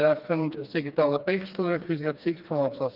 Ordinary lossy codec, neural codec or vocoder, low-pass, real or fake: Opus, 24 kbps; codec, 16 kHz, 2 kbps, FreqCodec, smaller model; 5.4 kHz; fake